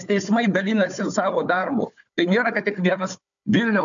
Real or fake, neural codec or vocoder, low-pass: fake; codec, 16 kHz, 4 kbps, FunCodec, trained on Chinese and English, 50 frames a second; 7.2 kHz